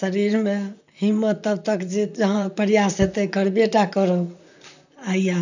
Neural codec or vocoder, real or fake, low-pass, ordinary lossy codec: vocoder, 44.1 kHz, 128 mel bands, Pupu-Vocoder; fake; 7.2 kHz; none